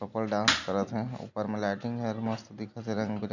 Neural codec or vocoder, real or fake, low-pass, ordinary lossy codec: none; real; 7.2 kHz; none